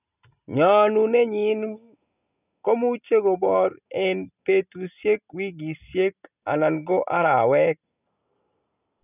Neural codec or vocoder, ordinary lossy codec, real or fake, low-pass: none; none; real; 3.6 kHz